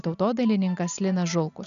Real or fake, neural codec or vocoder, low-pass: real; none; 7.2 kHz